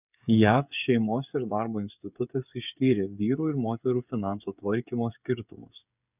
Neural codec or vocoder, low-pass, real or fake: codec, 16 kHz, 8 kbps, FreqCodec, smaller model; 3.6 kHz; fake